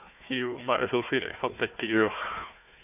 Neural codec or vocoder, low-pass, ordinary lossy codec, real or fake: codec, 16 kHz, 1 kbps, FunCodec, trained on Chinese and English, 50 frames a second; 3.6 kHz; none; fake